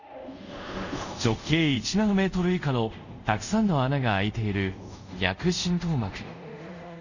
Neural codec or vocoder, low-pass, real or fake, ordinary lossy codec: codec, 24 kHz, 0.5 kbps, DualCodec; 7.2 kHz; fake; none